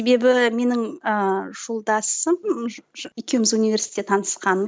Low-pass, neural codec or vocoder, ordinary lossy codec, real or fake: none; none; none; real